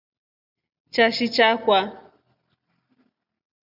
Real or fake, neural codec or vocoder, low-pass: real; none; 5.4 kHz